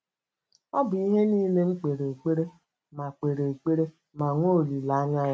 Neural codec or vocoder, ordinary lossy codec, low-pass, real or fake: none; none; none; real